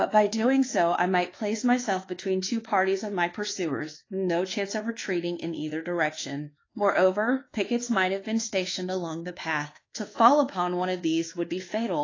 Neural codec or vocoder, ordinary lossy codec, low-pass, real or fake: codec, 24 kHz, 1.2 kbps, DualCodec; AAC, 32 kbps; 7.2 kHz; fake